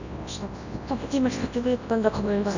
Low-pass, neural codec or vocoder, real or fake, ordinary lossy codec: 7.2 kHz; codec, 24 kHz, 0.9 kbps, WavTokenizer, large speech release; fake; none